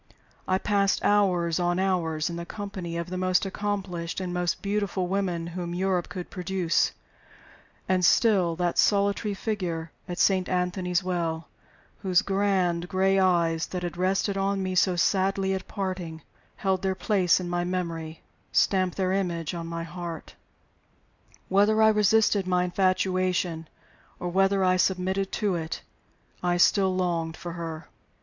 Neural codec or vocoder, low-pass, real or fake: none; 7.2 kHz; real